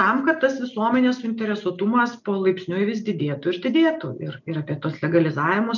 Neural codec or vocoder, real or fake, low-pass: none; real; 7.2 kHz